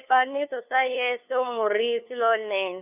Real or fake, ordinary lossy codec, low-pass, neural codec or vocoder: fake; none; 3.6 kHz; codec, 16 kHz, 2 kbps, FunCodec, trained on LibriTTS, 25 frames a second